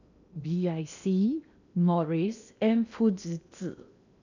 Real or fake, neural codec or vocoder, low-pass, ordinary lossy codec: fake; codec, 16 kHz in and 24 kHz out, 0.8 kbps, FocalCodec, streaming, 65536 codes; 7.2 kHz; none